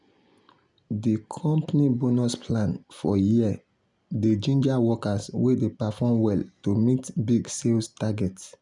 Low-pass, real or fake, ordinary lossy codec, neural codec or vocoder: 10.8 kHz; real; none; none